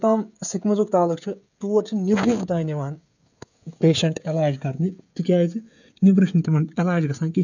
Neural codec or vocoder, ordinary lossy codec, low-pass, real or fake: codec, 16 kHz, 16 kbps, FreqCodec, smaller model; none; 7.2 kHz; fake